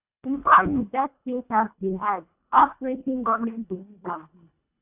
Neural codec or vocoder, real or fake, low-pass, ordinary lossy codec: codec, 24 kHz, 1.5 kbps, HILCodec; fake; 3.6 kHz; AAC, 32 kbps